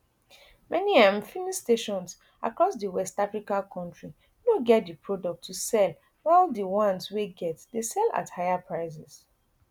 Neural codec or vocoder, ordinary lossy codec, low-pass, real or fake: none; none; 19.8 kHz; real